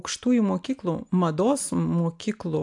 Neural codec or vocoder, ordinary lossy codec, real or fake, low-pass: none; AAC, 64 kbps; real; 10.8 kHz